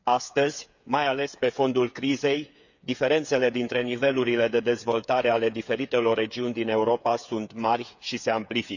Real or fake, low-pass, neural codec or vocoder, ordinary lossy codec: fake; 7.2 kHz; codec, 16 kHz, 8 kbps, FreqCodec, smaller model; none